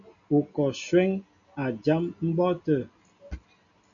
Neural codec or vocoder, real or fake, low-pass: none; real; 7.2 kHz